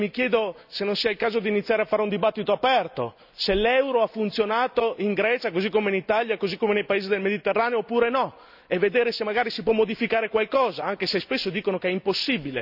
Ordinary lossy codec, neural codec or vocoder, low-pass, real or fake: none; none; 5.4 kHz; real